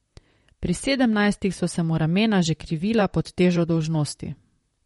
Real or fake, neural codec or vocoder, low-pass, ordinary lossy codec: fake; vocoder, 44.1 kHz, 128 mel bands, Pupu-Vocoder; 19.8 kHz; MP3, 48 kbps